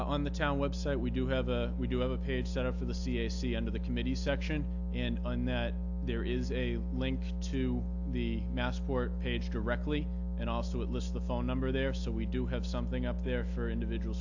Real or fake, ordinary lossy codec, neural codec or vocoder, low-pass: real; AAC, 48 kbps; none; 7.2 kHz